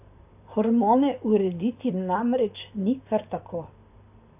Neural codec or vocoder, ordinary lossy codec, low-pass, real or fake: vocoder, 44.1 kHz, 128 mel bands, Pupu-Vocoder; AAC, 32 kbps; 3.6 kHz; fake